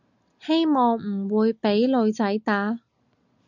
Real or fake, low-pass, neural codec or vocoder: real; 7.2 kHz; none